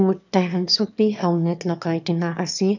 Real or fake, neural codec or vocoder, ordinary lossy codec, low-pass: fake; autoencoder, 22.05 kHz, a latent of 192 numbers a frame, VITS, trained on one speaker; none; 7.2 kHz